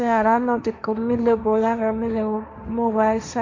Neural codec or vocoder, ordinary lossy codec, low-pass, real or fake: codec, 16 kHz, 1.1 kbps, Voila-Tokenizer; MP3, 48 kbps; 7.2 kHz; fake